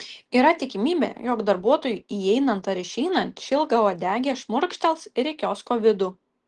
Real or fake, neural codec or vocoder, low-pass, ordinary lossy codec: real; none; 9.9 kHz; Opus, 16 kbps